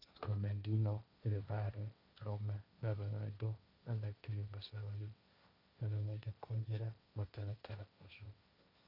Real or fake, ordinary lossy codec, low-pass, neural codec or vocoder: fake; none; 5.4 kHz; codec, 16 kHz, 1.1 kbps, Voila-Tokenizer